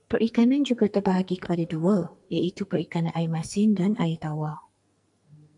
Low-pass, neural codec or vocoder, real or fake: 10.8 kHz; codec, 44.1 kHz, 2.6 kbps, SNAC; fake